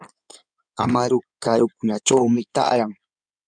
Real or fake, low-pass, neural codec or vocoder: fake; 9.9 kHz; codec, 16 kHz in and 24 kHz out, 2.2 kbps, FireRedTTS-2 codec